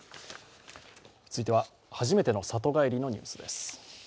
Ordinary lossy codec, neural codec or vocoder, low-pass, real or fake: none; none; none; real